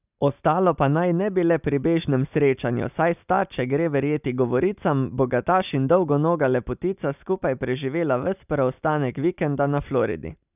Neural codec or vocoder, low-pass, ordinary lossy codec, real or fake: none; 3.6 kHz; none; real